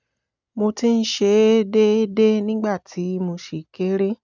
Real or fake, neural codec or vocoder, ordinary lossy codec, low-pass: real; none; none; 7.2 kHz